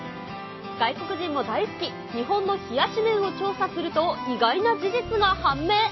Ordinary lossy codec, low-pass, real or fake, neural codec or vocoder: MP3, 24 kbps; 7.2 kHz; real; none